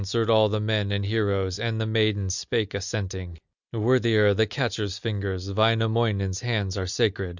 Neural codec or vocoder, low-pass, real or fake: none; 7.2 kHz; real